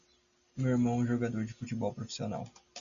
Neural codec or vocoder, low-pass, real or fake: none; 7.2 kHz; real